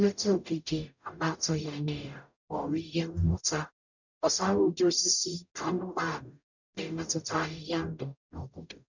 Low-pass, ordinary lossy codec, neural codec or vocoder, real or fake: 7.2 kHz; none; codec, 44.1 kHz, 0.9 kbps, DAC; fake